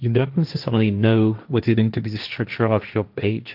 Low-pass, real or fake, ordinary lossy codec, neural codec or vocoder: 5.4 kHz; fake; Opus, 24 kbps; codec, 16 kHz, 1.1 kbps, Voila-Tokenizer